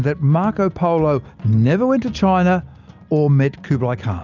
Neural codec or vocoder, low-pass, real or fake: none; 7.2 kHz; real